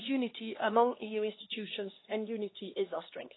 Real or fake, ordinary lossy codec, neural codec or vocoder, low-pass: fake; AAC, 16 kbps; codec, 16 kHz, 2 kbps, X-Codec, HuBERT features, trained on LibriSpeech; 7.2 kHz